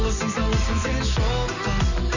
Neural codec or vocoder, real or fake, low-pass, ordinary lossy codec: none; real; 7.2 kHz; none